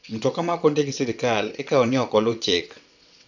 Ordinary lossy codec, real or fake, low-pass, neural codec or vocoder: none; fake; 7.2 kHz; vocoder, 22.05 kHz, 80 mel bands, WaveNeXt